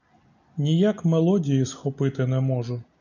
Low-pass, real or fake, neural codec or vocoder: 7.2 kHz; real; none